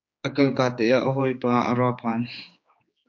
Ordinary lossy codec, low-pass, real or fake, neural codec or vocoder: MP3, 48 kbps; 7.2 kHz; fake; codec, 16 kHz, 4 kbps, X-Codec, HuBERT features, trained on general audio